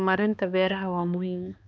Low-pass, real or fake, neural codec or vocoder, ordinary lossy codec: none; fake; codec, 16 kHz, 2 kbps, X-Codec, HuBERT features, trained on balanced general audio; none